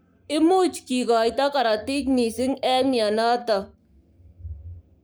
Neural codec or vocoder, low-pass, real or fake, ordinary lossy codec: codec, 44.1 kHz, 7.8 kbps, Pupu-Codec; none; fake; none